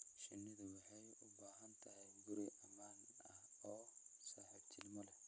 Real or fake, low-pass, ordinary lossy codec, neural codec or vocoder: real; none; none; none